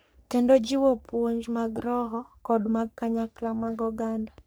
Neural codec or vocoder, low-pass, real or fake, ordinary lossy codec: codec, 44.1 kHz, 3.4 kbps, Pupu-Codec; none; fake; none